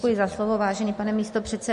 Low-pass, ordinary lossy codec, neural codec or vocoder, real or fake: 10.8 kHz; MP3, 48 kbps; none; real